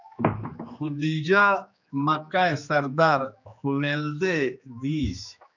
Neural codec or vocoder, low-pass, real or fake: codec, 16 kHz, 2 kbps, X-Codec, HuBERT features, trained on general audio; 7.2 kHz; fake